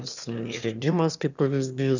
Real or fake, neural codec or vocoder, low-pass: fake; autoencoder, 22.05 kHz, a latent of 192 numbers a frame, VITS, trained on one speaker; 7.2 kHz